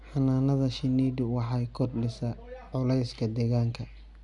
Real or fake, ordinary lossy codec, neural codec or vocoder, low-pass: real; Opus, 64 kbps; none; 10.8 kHz